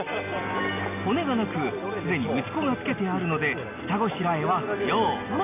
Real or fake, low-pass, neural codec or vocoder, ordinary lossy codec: real; 3.6 kHz; none; none